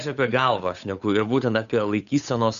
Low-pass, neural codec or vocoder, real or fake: 7.2 kHz; codec, 16 kHz, 8 kbps, FunCodec, trained on Chinese and English, 25 frames a second; fake